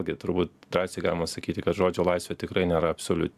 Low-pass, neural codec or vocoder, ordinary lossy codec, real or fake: 14.4 kHz; none; AAC, 96 kbps; real